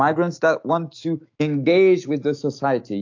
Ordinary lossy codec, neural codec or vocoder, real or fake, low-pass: AAC, 48 kbps; autoencoder, 48 kHz, 128 numbers a frame, DAC-VAE, trained on Japanese speech; fake; 7.2 kHz